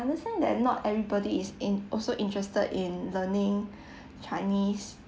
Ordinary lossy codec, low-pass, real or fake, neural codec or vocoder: none; none; real; none